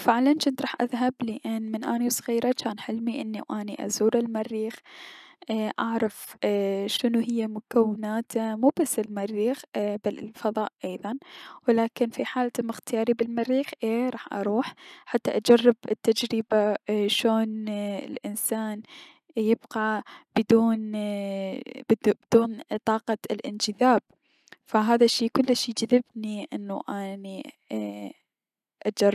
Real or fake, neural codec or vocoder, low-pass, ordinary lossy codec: real; none; 14.4 kHz; none